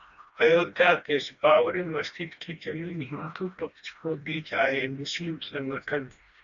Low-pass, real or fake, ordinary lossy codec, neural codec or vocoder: 7.2 kHz; fake; Opus, 64 kbps; codec, 16 kHz, 1 kbps, FreqCodec, smaller model